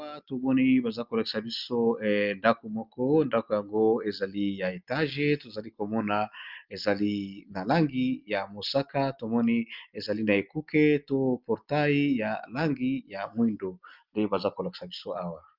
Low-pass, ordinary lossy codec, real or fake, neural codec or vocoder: 5.4 kHz; Opus, 24 kbps; real; none